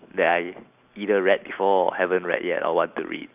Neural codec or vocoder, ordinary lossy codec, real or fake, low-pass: none; none; real; 3.6 kHz